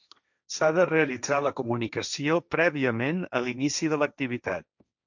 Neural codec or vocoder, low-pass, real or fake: codec, 16 kHz, 1.1 kbps, Voila-Tokenizer; 7.2 kHz; fake